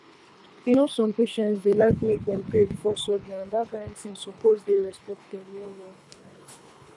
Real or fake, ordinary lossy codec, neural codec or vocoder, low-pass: fake; none; codec, 24 kHz, 3 kbps, HILCodec; none